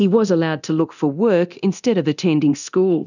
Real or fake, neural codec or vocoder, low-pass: fake; codec, 24 kHz, 0.9 kbps, DualCodec; 7.2 kHz